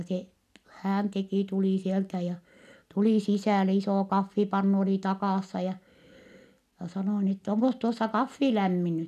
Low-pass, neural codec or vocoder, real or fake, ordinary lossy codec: 14.4 kHz; none; real; none